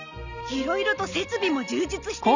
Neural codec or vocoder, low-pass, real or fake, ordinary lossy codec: none; 7.2 kHz; real; none